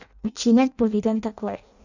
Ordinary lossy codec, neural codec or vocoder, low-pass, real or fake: none; codec, 16 kHz in and 24 kHz out, 0.6 kbps, FireRedTTS-2 codec; 7.2 kHz; fake